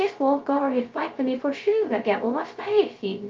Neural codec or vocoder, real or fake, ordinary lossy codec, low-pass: codec, 16 kHz, 0.2 kbps, FocalCodec; fake; Opus, 24 kbps; 7.2 kHz